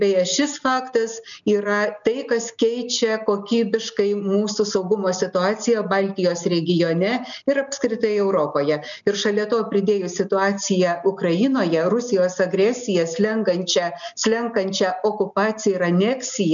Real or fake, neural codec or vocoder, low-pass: real; none; 7.2 kHz